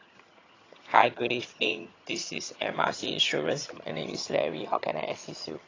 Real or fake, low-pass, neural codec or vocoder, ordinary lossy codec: fake; 7.2 kHz; vocoder, 22.05 kHz, 80 mel bands, HiFi-GAN; AAC, 32 kbps